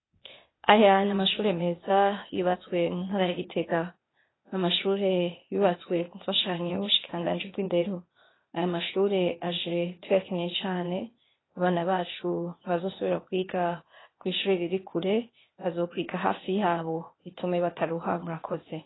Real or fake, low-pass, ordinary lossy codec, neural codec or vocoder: fake; 7.2 kHz; AAC, 16 kbps; codec, 16 kHz, 0.8 kbps, ZipCodec